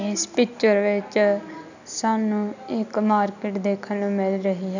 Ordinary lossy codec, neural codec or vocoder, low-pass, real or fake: none; none; 7.2 kHz; real